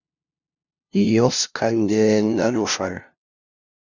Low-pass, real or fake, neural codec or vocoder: 7.2 kHz; fake; codec, 16 kHz, 0.5 kbps, FunCodec, trained on LibriTTS, 25 frames a second